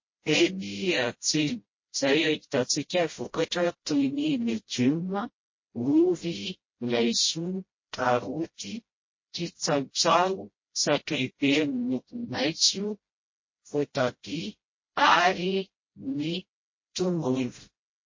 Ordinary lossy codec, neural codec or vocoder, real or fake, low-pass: MP3, 32 kbps; codec, 16 kHz, 0.5 kbps, FreqCodec, smaller model; fake; 7.2 kHz